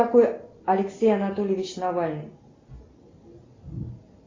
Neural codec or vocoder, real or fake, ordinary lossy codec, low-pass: none; real; AAC, 32 kbps; 7.2 kHz